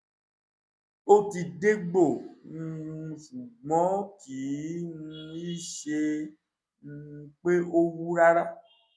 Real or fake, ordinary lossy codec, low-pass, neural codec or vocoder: real; none; none; none